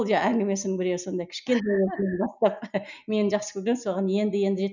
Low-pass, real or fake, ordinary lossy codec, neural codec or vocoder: 7.2 kHz; real; none; none